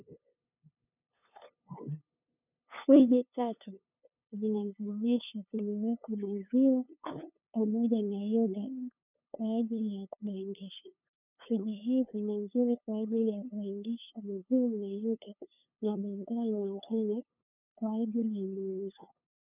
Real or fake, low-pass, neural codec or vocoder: fake; 3.6 kHz; codec, 16 kHz, 2 kbps, FunCodec, trained on LibriTTS, 25 frames a second